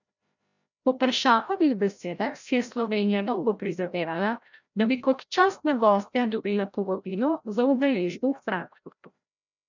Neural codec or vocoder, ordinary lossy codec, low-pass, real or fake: codec, 16 kHz, 0.5 kbps, FreqCodec, larger model; none; 7.2 kHz; fake